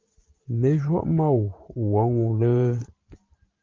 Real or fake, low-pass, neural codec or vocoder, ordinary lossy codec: fake; 7.2 kHz; vocoder, 44.1 kHz, 128 mel bands every 512 samples, BigVGAN v2; Opus, 32 kbps